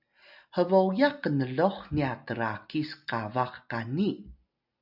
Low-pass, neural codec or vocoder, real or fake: 5.4 kHz; none; real